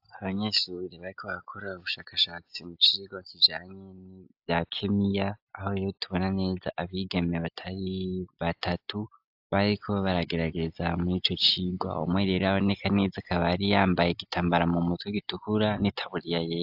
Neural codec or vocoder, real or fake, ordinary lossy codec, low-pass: none; real; AAC, 48 kbps; 5.4 kHz